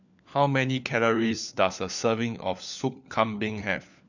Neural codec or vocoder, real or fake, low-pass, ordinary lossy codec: codec, 16 kHz, 4 kbps, FunCodec, trained on LibriTTS, 50 frames a second; fake; 7.2 kHz; none